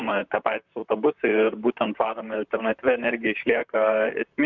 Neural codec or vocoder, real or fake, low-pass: vocoder, 44.1 kHz, 128 mel bands, Pupu-Vocoder; fake; 7.2 kHz